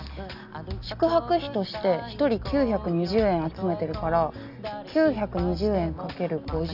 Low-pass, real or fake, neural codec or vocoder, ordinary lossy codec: 5.4 kHz; real; none; none